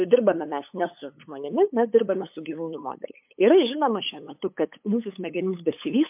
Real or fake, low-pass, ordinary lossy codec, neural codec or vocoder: fake; 3.6 kHz; MP3, 32 kbps; codec, 16 kHz, 8 kbps, FunCodec, trained on LibriTTS, 25 frames a second